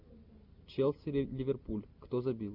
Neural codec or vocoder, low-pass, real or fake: none; 5.4 kHz; real